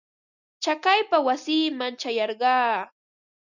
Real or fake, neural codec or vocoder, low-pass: real; none; 7.2 kHz